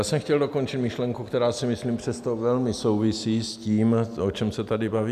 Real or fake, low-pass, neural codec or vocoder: real; 14.4 kHz; none